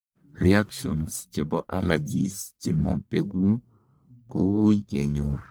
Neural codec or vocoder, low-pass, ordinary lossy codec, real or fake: codec, 44.1 kHz, 1.7 kbps, Pupu-Codec; none; none; fake